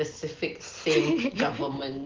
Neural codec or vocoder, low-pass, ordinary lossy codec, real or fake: none; 7.2 kHz; Opus, 16 kbps; real